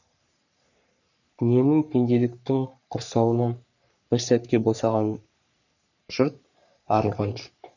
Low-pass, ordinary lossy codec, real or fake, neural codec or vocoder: 7.2 kHz; none; fake; codec, 44.1 kHz, 3.4 kbps, Pupu-Codec